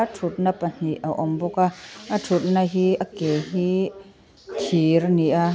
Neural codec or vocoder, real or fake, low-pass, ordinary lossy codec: none; real; none; none